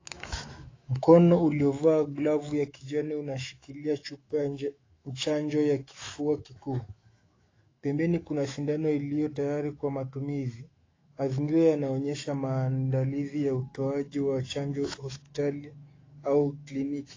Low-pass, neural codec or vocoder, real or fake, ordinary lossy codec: 7.2 kHz; autoencoder, 48 kHz, 128 numbers a frame, DAC-VAE, trained on Japanese speech; fake; AAC, 32 kbps